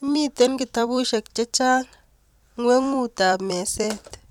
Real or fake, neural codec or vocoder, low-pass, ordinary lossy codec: fake; vocoder, 44.1 kHz, 128 mel bands every 256 samples, BigVGAN v2; 19.8 kHz; none